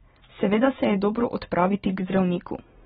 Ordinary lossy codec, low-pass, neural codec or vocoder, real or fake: AAC, 16 kbps; 7.2 kHz; none; real